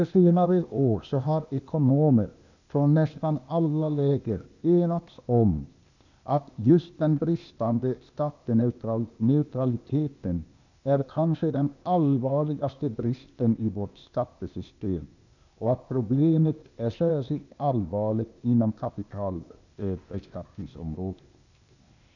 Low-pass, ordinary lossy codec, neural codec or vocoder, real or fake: 7.2 kHz; none; codec, 16 kHz, 0.8 kbps, ZipCodec; fake